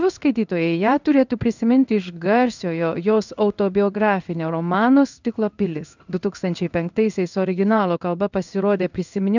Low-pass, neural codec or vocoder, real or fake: 7.2 kHz; codec, 16 kHz in and 24 kHz out, 1 kbps, XY-Tokenizer; fake